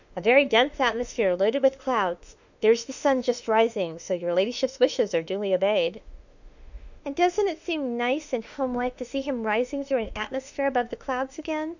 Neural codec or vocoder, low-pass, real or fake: autoencoder, 48 kHz, 32 numbers a frame, DAC-VAE, trained on Japanese speech; 7.2 kHz; fake